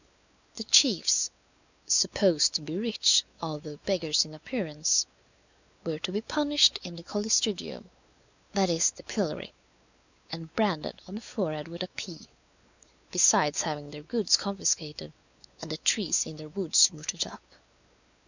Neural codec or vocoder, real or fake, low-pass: codec, 16 kHz, 4 kbps, X-Codec, WavLM features, trained on Multilingual LibriSpeech; fake; 7.2 kHz